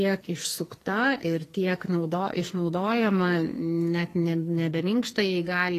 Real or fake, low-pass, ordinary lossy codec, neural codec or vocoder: fake; 14.4 kHz; AAC, 48 kbps; codec, 44.1 kHz, 2.6 kbps, SNAC